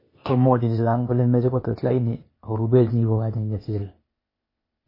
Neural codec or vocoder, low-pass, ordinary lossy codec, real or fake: codec, 16 kHz, 0.8 kbps, ZipCodec; 5.4 kHz; MP3, 24 kbps; fake